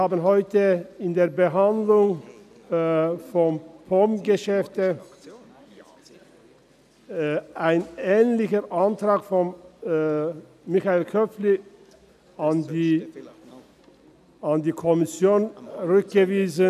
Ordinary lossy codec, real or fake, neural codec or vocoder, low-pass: none; real; none; 14.4 kHz